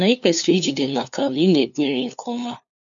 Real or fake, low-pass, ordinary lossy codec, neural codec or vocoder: fake; 7.2 kHz; MP3, 64 kbps; codec, 16 kHz, 2 kbps, FunCodec, trained on LibriTTS, 25 frames a second